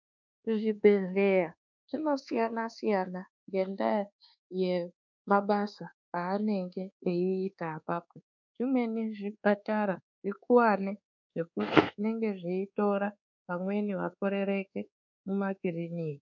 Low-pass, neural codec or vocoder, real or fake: 7.2 kHz; codec, 24 kHz, 1.2 kbps, DualCodec; fake